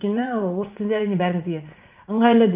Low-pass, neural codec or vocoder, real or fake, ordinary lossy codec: 3.6 kHz; vocoder, 44.1 kHz, 80 mel bands, Vocos; fake; Opus, 32 kbps